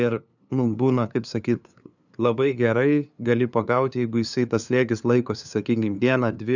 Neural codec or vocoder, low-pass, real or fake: codec, 16 kHz, 2 kbps, FunCodec, trained on LibriTTS, 25 frames a second; 7.2 kHz; fake